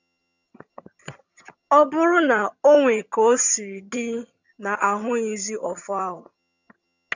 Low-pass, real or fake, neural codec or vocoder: 7.2 kHz; fake; vocoder, 22.05 kHz, 80 mel bands, HiFi-GAN